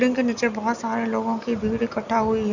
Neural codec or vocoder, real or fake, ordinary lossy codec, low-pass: none; real; none; 7.2 kHz